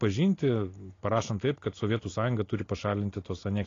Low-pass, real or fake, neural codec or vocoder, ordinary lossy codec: 7.2 kHz; real; none; AAC, 32 kbps